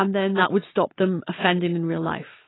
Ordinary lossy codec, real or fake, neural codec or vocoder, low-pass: AAC, 16 kbps; real; none; 7.2 kHz